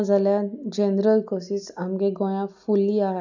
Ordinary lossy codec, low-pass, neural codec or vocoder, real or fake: none; 7.2 kHz; none; real